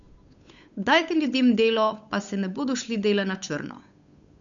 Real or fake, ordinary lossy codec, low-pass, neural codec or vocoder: fake; none; 7.2 kHz; codec, 16 kHz, 8 kbps, FunCodec, trained on Chinese and English, 25 frames a second